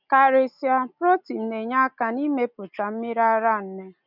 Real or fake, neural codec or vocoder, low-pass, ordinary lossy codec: real; none; 5.4 kHz; none